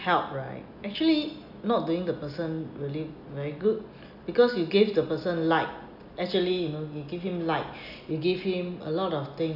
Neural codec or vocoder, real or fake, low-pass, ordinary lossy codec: none; real; 5.4 kHz; none